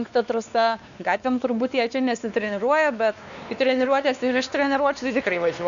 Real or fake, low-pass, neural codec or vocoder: fake; 7.2 kHz; codec, 16 kHz, 2 kbps, X-Codec, WavLM features, trained on Multilingual LibriSpeech